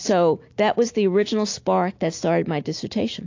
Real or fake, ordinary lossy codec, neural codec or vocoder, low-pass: real; AAC, 48 kbps; none; 7.2 kHz